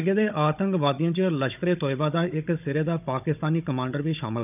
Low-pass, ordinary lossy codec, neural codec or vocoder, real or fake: 3.6 kHz; none; codec, 16 kHz, 16 kbps, FunCodec, trained on Chinese and English, 50 frames a second; fake